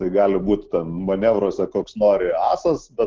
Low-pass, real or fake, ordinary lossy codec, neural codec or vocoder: 7.2 kHz; real; Opus, 16 kbps; none